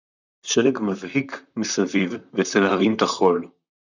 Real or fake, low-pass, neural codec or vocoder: fake; 7.2 kHz; vocoder, 44.1 kHz, 128 mel bands, Pupu-Vocoder